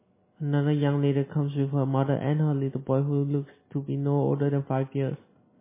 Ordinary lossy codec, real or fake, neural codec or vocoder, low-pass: MP3, 16 kbps; real; none; 3.6 kHz